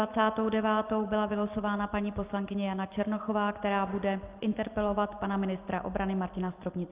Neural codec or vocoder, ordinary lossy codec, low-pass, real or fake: none; Opus, 24 kbps; 3.6 kHz; real